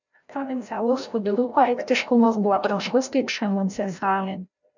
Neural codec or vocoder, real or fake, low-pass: codec, 16 kHz, 0.5 kbps, FreqCodec, larger model; fake; 7.2 kHz